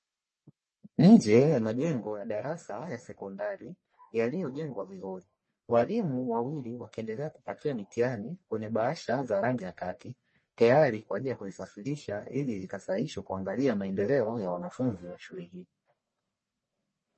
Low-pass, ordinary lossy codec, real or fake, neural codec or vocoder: 10.8 kHz; MP3, 32 kbps; fake; codec, 44.1 kHz, 1.7 kbps, Pupu-Codec